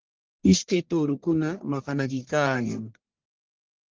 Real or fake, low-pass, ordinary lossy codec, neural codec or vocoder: fake; 7.2 kHz; Opus, 16 kbps; codec, 44.1 kHz, 1.7 kbps, Pupu-Codec